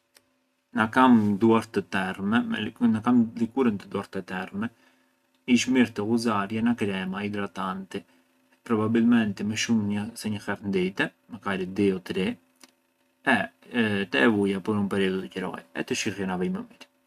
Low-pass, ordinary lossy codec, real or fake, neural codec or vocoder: 14.4 kHz; none; real; none